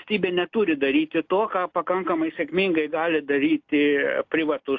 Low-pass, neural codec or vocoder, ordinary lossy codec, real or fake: 7.2 kHz; none; AAC, 48 kbps; real